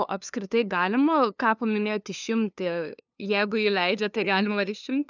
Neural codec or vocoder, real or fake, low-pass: codec, 16 kHz, 2 kbps, FunCodec, trained on LibriTTS, 25 frames a second; fake; 7.2 kHz